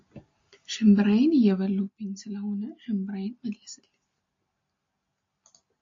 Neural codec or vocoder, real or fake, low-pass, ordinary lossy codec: none; real; 7.2 kHz; AAC, 48 kbps